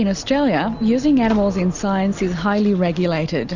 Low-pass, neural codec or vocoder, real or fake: 7.2 kHz; none; real